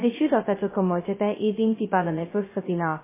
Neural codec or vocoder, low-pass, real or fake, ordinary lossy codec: codec, 16 kHz, 0.2 kbps, FocalCodec; 3.6 kHz; fake; MP3, 16 kbps